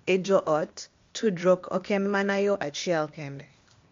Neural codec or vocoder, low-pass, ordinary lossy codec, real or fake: codec, 16 kHz, 0.8 kbps, ZipCodec; 7.2 kHz; MP3, 48 kbps; fake